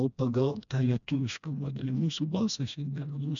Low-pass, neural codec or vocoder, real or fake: 7.2 kHz; codec, 16 kHz, 1 kbps, FreqCodec, smaller model; fake